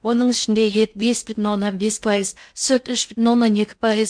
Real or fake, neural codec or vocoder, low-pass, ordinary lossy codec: fake; codec, 16 kHz in and 24 kHz out, 0.6 kbps, FocalCodec, streaming, 2048 codes; 9.9 kHz; MP3, 64 kbps